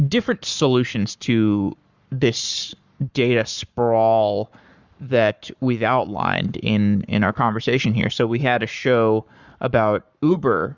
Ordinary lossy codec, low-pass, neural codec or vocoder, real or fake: Opus, 64 kbps; 7.2 kHz; codec, 44.1 kHz, 7.8 kbps, Pupu-Codec; fake